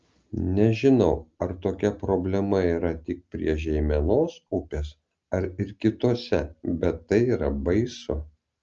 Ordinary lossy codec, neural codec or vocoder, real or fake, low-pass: Opus, 24 kbps; none; real; 7.2 kHz